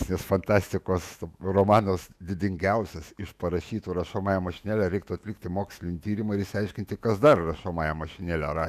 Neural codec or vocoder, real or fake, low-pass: autoencoder, 48 kHz, 128 numbers a frame, DAC-VAE, trained on Japanese speech; fake; 14.4 kHz